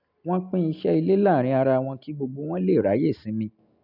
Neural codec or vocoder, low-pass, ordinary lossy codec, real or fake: none; 5.4 kHz; none; real